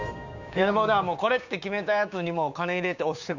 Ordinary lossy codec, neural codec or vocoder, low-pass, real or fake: none; codec, 16 kHz, 6 kbps, DAC; 7.2 kHz; fake